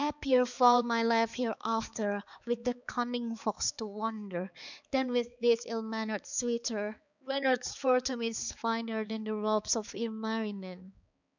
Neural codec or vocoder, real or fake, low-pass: codec, 16 kHz, 4 kbps, X-Codec, HuBERT features, trained on balanced general audio; fake; 7.2 kHz